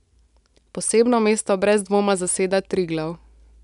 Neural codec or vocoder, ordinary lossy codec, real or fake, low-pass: none; none; real; 10.8 kHz